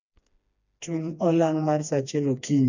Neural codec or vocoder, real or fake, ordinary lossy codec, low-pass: codec, 16 kHz, 2 kbps, FreqCodec, smaller model; fake; none; 7.2 kHz